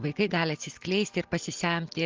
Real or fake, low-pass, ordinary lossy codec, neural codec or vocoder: real; 7.2 kHz; Opus, 16 kbps; none